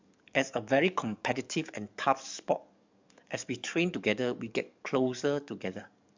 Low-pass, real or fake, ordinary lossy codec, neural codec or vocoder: 7.2 kHz; fake; MP3, 64 kbps; codec, 44.1 kHz, 7.8 kbps, DAC